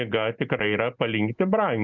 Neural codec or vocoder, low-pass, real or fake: none; 7.2 kHz; real